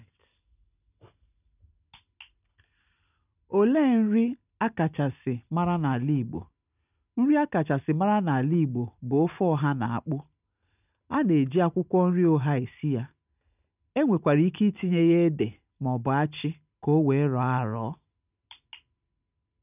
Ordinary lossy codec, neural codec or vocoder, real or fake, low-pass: none; none; real; 3.6 kHz